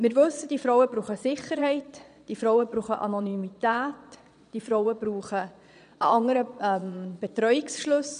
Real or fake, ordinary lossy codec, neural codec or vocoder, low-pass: fake; none; vocoder, 22.05 kHz, 80 mel bands, Vocos; 9.9 kHz